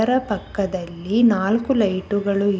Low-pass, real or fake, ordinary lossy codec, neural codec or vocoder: none; real; none; none